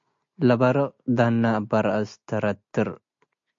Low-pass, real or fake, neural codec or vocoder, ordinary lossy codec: 7.2 kHz; real; none; MP3, 48 kbps